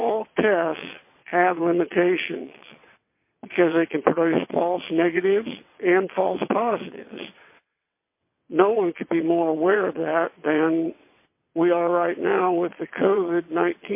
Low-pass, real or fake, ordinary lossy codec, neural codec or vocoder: 3.6 kHz; fake; MP3, 24 kbps; vocoder, 22.05 kHz, 80 mel bands, WaveNeXt